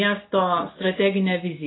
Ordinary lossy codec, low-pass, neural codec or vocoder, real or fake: AAC, 16 kbps; 7.2 kHz; none; real